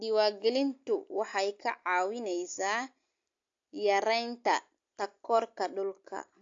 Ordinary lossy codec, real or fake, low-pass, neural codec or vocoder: AAC, 48 kbps; real; 7.2 kHz; none